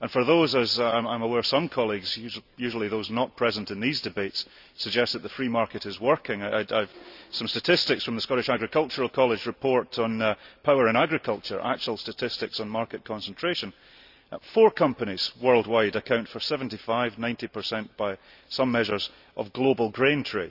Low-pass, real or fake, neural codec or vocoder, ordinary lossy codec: 5.4 kHz; real; none; none